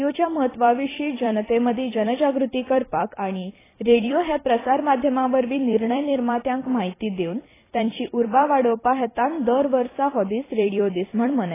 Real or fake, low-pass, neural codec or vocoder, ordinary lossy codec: fake; 3.6 kHz; vocoder, 44.1 kHz, 128 mel bands every 256 samples, BigVGAN v2; AAC, 16 kbps